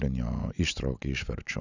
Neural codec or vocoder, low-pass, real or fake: none; 7.2 kHz; real